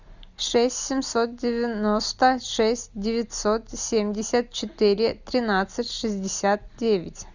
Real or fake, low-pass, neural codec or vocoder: real; 7.2 kHz; none